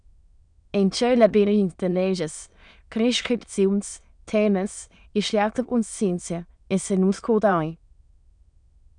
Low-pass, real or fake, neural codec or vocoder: 9.9 kHz; fake; autoencoder, 22.05 kHz, a latent of 192 numbers a frame, VITS, trained on many speakers